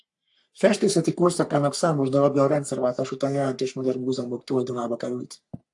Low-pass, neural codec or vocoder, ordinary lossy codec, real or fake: 10.8 kHz; codec, 44.1 kHz, 3.4 kbps, Pupu-Codec; AAC, 64 kbps; fake